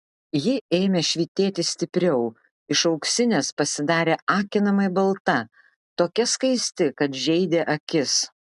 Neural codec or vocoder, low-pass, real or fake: none; 10.8 kHz; real